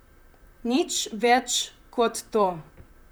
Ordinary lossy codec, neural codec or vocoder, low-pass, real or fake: none; vocoder, 44.1 kHz, 128 mel bands, Pupu-Vocoder; none; fake